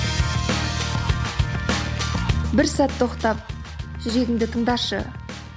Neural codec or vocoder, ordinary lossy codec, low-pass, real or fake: none; none; none; real